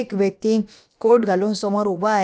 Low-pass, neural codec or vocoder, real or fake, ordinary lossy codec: none; codec, 16 kHz, about 1 kbps, DyCAST, with the encoder's durations; fake; none